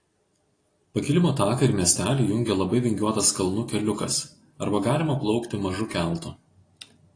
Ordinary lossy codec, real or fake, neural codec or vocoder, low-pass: AAC, 32 kbps; real; none; 9.9 kHz